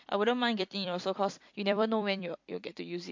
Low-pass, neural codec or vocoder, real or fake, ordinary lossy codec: 7.2 kHz; vocoder, 44.1 kHz, 128 mel bands every 256 samples, BigVGAN v2; fake; MP3, 48 kbps